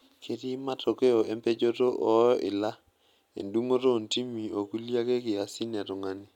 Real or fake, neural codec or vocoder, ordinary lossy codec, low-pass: real; none; none; 19.8 kHz